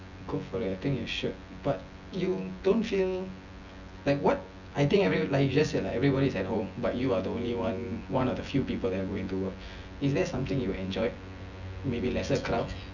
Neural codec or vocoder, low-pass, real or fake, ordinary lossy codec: vocoder, 24 kHz, 100 mel bands, Vocos; 7.2 kHz; fake; none